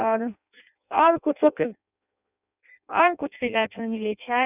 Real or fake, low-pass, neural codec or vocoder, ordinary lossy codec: fake; 3.6 kHz; codec, 16 kHz in and 24 kHz out, 0.6 kbps, FireRedTTS-2 codec; none